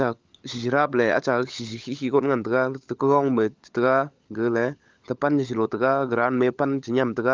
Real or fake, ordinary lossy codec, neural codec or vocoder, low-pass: fake; Opus, 24 kbps; codec, 16 kHz, 16 kbps, FunCodec, trained on LibriTTS, 50 frames a second; 7.2 kHz